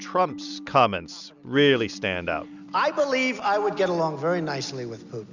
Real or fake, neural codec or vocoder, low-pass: real; none; 7.2 kHz